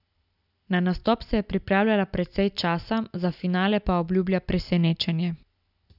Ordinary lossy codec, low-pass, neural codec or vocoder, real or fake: none; 5.4 kHz; none; real